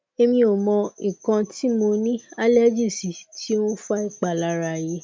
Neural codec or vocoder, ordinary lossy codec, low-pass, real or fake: none; none; none; real